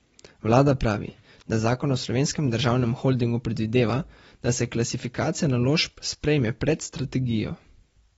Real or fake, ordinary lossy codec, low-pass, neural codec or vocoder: real; AAC, 24 kbps; 19.8 kHz; none